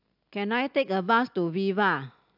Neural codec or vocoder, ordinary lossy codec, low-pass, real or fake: none; AAC, 48 kbps; 5.4 kHz; real